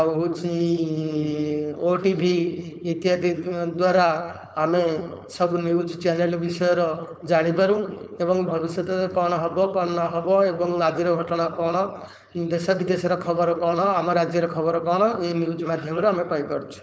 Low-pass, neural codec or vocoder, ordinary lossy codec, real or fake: none; codec, 16 kHz, 4.8 kbps, FACodec; none; fake